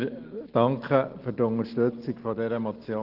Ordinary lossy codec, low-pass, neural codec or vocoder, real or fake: Opus, 16 kbps; 5.4 kHz; none; real